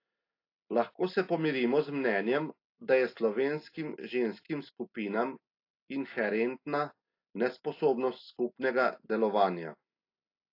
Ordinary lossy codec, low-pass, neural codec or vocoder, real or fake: AAC, 32 kbps; 5.4 kHz; none; real